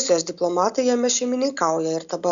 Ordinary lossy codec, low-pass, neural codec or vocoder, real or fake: Opus, 64 kbps; 7.2 kHz; none; real